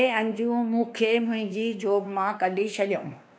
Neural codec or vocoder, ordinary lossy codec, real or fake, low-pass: codec, 16 kHz, 2 kbps, X-Codec, WavLM features, trained on Multilingual LibriSpeech; none; fake; none